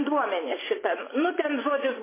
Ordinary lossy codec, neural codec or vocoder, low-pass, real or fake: MP3, 16 kbps; vocoder, 24 kHz, 100 mel bands, Vocos; 3.6 kHz; fake